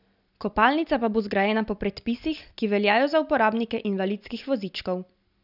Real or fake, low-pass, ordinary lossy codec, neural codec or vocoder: real; 5.4 kHz; none; none